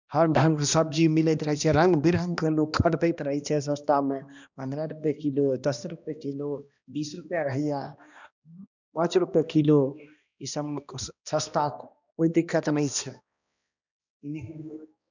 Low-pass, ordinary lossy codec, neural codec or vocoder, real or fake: 7.2 kHz; none; codec, 16 kHz, 1 kbps, X-Codec, HuBERT features, trained on balanced general audio; fake